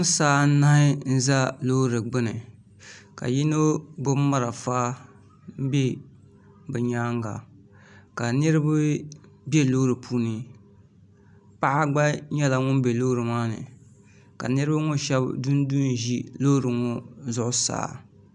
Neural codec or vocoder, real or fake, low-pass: none; real; 10.8 kHz